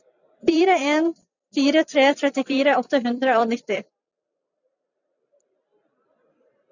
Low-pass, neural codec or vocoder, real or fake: 7.2 kHz; vocoder, 44.1 kHz, 128 mel bands every 256 samples, BigVGAN v2; fake